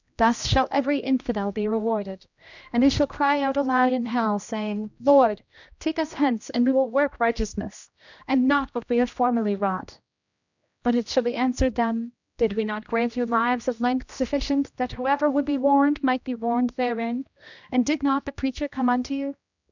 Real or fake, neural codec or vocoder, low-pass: fake; codec, 16 kHz, 1 kbps, X-Codec, HuBERT features, trained on general audio; 7.2 kHz